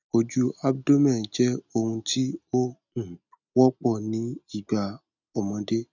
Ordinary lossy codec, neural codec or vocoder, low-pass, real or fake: none; none; 7.2 kHz; real